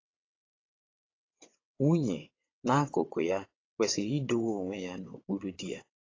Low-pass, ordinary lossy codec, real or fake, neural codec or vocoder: 7.2 kHz; AAC, 32 kbps; fake; vocoder, 22.05 kHz, 80 mel bands, WaveNeXt